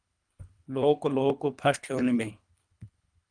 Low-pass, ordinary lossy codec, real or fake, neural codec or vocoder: 9.9 kHz; Opus, 32 kbps; fake; codec, 24 kHz, 3 kbps, HILCodec